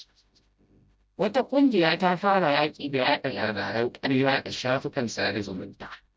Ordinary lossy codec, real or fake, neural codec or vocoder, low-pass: none; fake; codec, 16 kHz, 0.5 kbps, FreqCodec, smaller model; none